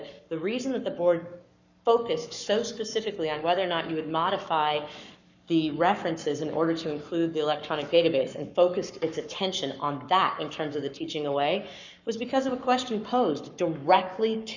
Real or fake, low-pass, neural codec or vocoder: fake; 7.2 kHz; codec, 44.1 kHz, 7.8 kbps, Pupu-Codec